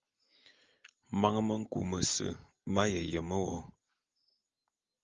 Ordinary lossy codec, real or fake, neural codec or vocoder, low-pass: Opus, 32 kbps; real; none; 7.2 kHz